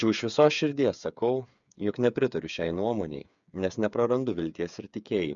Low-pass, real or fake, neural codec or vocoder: 7.2 kHz; fake; codec, 16 kHz, 8 kbps, FreqCodec, smaller model